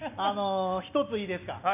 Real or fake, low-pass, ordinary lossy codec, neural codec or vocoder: real; 3.6 kHz; none; none